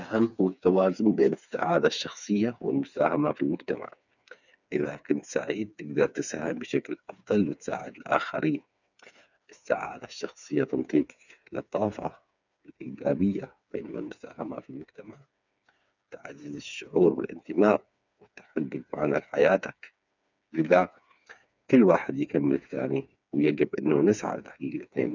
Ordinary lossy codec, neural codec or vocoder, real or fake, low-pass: none; codec, 16 kHz, 4 kbps, FreqCodec, smaller model; fake; 7.2 kHz